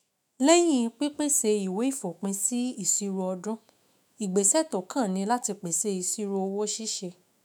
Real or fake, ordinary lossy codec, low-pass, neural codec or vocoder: fake; none; none; autoencoder, 48 kHz, 128 numbers a frame, DAC-VAE, trained on Japanese speech